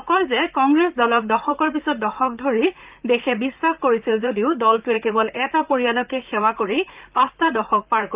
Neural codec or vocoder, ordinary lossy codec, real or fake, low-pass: vocoder, 44.1 kHz, 128 mel bands, Pupu-Vocoder; Opus, 32 kbps; fake; 3.6 kHz